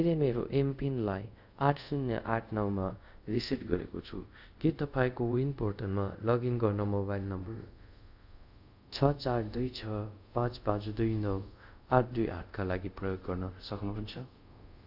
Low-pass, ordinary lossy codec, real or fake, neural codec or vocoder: 5.4 kHz; none; fake; codec, 24 kHz, 0.5 kbps, DualCodec